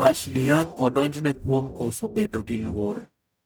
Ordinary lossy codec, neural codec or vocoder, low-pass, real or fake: none; codec, 44.1 kHz, 0.9 kbps, DAC; none; fake